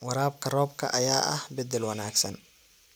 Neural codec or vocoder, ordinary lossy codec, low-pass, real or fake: none; none; none; real